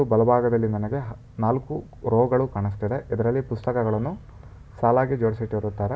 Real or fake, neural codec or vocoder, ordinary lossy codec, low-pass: real; none; none; none